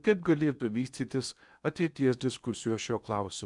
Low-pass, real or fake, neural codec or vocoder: 10.8 kHz; fake; codec, 16 kHz in and 24 kHz out, 0.8 kbps, FocalCodec, streaming, 65536 codes